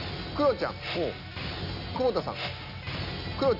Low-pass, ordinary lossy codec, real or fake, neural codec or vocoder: 5.4 kHz; none; real; none